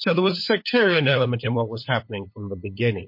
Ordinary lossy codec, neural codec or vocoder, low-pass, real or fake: MP3, 32 kbps; codec, 16 kHz, 8 kbps, FunCodec, trained on LibriTTS, 25 frames a second; 5.4 kHz; fake